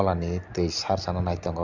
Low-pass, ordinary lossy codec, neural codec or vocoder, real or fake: 7.2 kHz; none; none; real